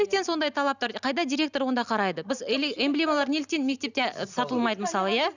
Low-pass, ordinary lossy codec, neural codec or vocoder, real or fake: 7.2 kHz; none; none; real